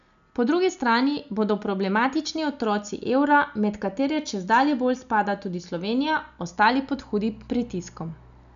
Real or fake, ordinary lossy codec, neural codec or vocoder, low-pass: real; none; none; 7.2 kHz